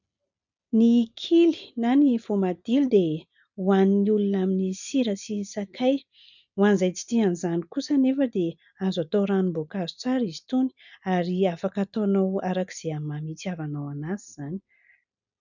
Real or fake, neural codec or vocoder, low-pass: real; none; 7.2 kHz